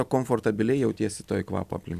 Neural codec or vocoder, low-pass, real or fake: none; 14.4 kHz; real